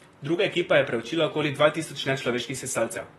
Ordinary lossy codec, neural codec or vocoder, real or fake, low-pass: AAC, 32 kbps; vocoder, 44.1 kHz, 128 mel bands, Pupu-Vocoder; fake; 19.8 kHz